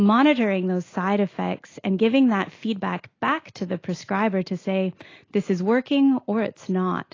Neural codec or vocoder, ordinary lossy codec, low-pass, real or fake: none; AAC, 32 kbps; 7.2 kHz; real